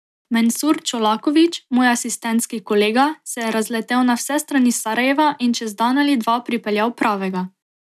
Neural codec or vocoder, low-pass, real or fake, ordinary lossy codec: none; 14.4 kHz; real; none